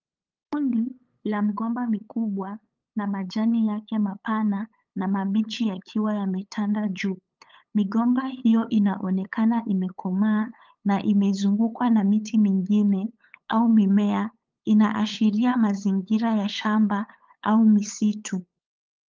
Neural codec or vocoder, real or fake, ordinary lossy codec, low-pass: codec, 16 kHz, 8 kbps, FunCodec, trained on LibriTTS, 25 frames a second; fake; Opus, 24 kbps; 7.2 kHz